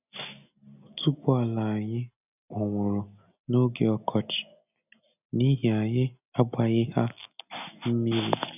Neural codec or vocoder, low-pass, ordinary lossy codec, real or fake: none; 3.6 kHz; none; real